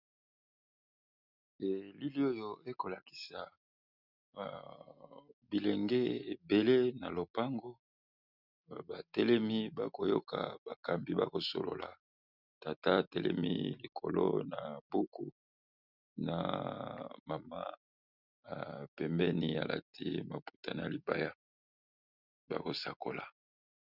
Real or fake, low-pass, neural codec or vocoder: real; 5.4 kHz; none